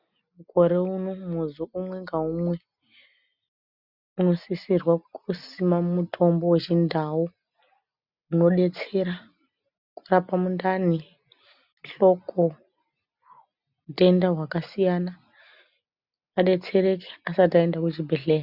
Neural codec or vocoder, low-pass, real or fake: none; 5.4 kHz; real